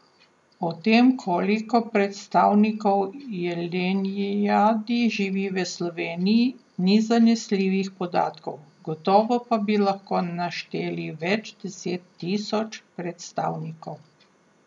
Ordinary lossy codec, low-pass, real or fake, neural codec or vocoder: none; 10.8 kHz; real; none